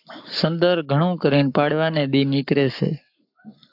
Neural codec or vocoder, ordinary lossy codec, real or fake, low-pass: codec, 44.1 kHz, 7.8 kbps, Pupu-Codec; AAC, 48 kbps; fake; 5.4 kHz